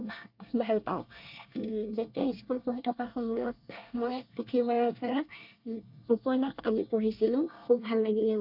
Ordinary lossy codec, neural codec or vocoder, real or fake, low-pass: none; codec, 24 kHz, 1 kbps, SNAC; fake; 5.4 kHz